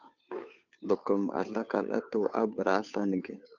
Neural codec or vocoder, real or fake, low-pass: codec, 16 kHz, 2 kbps, FunCodec, trained on Chinese and English, 25 frames a second; fake; 7.2 kHz